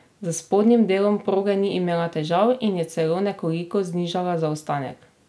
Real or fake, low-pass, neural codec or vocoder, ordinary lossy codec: real; none; none; none